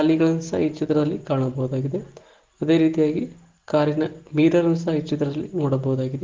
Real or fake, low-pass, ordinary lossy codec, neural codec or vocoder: real; 7.2 kHz; Opus, 16 kbps; none